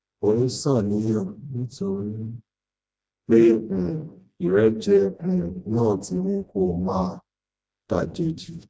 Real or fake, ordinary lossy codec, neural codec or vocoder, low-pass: fake; none; codec, 16 kHz, 1 kbps, FreqCodec, smaller model; none